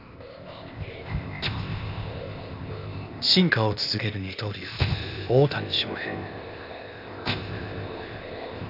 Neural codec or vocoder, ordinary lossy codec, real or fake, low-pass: codec, 16 kHz, 0.8 kbps, ZipCodec; none; fake; 5.4 kHz